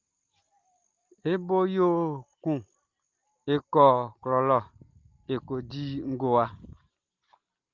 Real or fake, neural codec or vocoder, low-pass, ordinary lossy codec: real; none; 7.2 kHz; Opus, 32 kbps